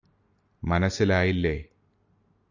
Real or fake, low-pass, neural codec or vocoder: real; 7.2 kHz; none